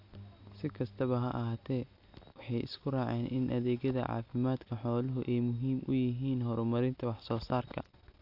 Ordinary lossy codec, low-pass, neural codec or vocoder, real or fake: AAC, 48 kbps; 5.4 kHz; none; real